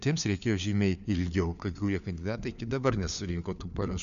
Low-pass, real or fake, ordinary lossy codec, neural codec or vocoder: 7.2 kHz; fake; MP3, 96 kbps; codec, 16 kHz, 4 kbps, FunCodec, trained on LibriTTS, 50 frames a second